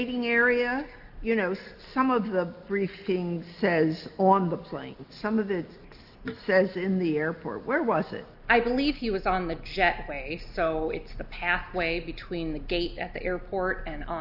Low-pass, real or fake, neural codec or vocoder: 5.4 kHz; real; none